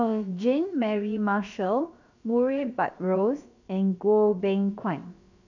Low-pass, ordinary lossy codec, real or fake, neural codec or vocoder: 7.2 kHz; none; fake; codec, 16 kHz, about 1 kbps, DyCAST, with the encoder's durations